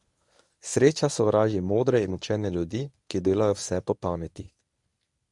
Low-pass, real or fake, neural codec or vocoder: 10.8 kHz; fake; codec, 24 kHz, 0.9 kbps, WavTokenizer, medium speech release version 1